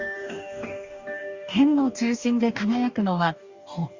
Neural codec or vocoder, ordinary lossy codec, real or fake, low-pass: codec, 44.1 kHz, 2.6 kbps, DAC; Opus, 64 kbps; fake; 7.2 kHz